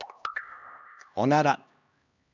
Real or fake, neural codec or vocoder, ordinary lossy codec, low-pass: fake; codec, 16 kHz, 1 kbps, X-Codec, HuBERT features, trained on LibriSpeech; Opus, 64 kbps; 7.2 kHz